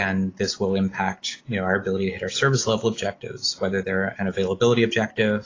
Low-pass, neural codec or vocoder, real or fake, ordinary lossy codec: 7.2 kHz; none; real; AAC, 32 kbps